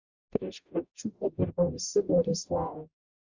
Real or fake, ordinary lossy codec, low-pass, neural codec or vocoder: fake; Opus, 64 kbps; 7.2 kHz; codec, 44.1 kHz, 0.9 kbps, DAC